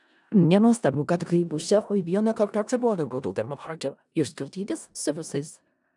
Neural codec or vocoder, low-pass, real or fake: codec, 16 kHz in and 24 kHz out, 0.4 kbps, LongCat-Audio-Codec, four codebook decoder; 10.8 kHz; fake